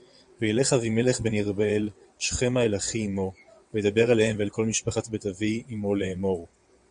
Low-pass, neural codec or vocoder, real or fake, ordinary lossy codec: 9.9 kHz; vocoder, 22.05 kHz, 80 mel bands, WaveNeXt; fake; Opus, 64 kbps